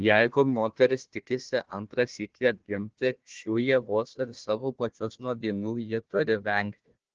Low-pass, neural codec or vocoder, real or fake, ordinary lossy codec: 7.2 kHz; codec, 16 kHz, 1 kbps, FunCodec, trained on Chinese and English, 50 frames a second; fake; Opus, 16 kbps